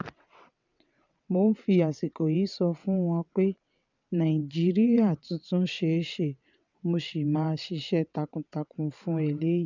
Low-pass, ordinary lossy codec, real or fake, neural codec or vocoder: 7.2 kHz; none; fake; vocoder, 22.05 kHz, 80 mel bands, WaveNeXt